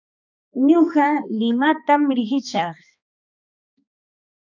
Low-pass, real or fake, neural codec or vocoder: 7.2 kHz; fake; codec, 16 kHz, 2 kbps, X-Codec, HuBERT features, trained on balanced general audio